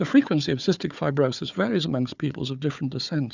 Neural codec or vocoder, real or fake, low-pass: codec, 16 kHz, 4 kbps, FunCodec, trained on Chinese and English, 50 frames a second; fake; 7.2 kHz